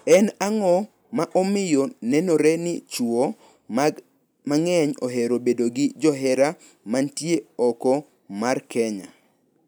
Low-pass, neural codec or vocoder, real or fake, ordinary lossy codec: none; none; real; none